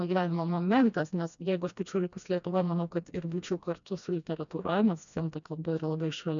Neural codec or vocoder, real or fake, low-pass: codec, 16 kHz, 1 kbps, FreqCodec, smaller model; fake; 7.2 kHz